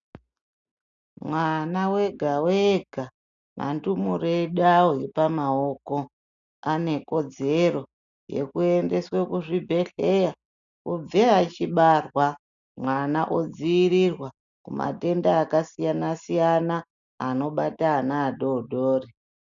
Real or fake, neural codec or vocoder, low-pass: real; none; 7.2 kHz